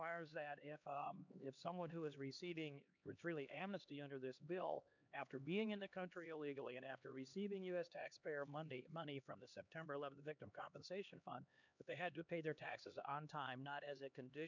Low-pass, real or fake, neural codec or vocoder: 7.2 kHz; fake; codec, 16 kHz, 2 kbps, X-Codec, HuBERT features, trained on LibriSpeech